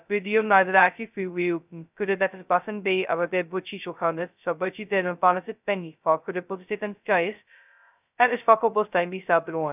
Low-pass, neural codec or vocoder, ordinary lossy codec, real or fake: 3.6 kHz; codec, 16 kHz, 0.2 kbps, FocalCodec; none; fake